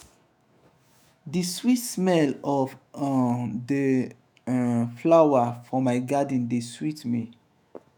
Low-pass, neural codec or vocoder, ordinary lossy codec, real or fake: none; autoencoder, 48 kHz, 128 numbers a frame, DAC-VAE, trained on Japanese speech; none; fake